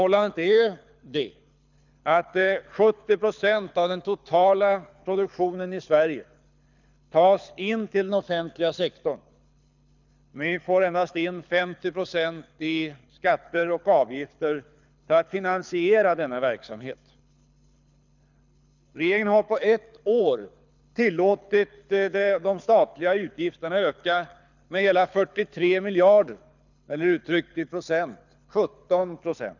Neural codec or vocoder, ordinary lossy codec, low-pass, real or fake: codec, 24 kHz, 6 kbps, HILCodec; none; 7.2 kHz; fake